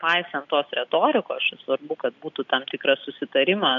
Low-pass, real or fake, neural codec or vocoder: 7.2 kHz; real; none